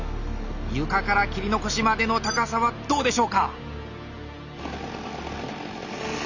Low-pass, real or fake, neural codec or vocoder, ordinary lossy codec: 7.2 kHz; real; none; none